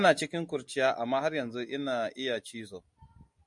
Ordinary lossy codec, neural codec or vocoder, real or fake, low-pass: MP3, 64 kbps; none; real; 10.8 kHz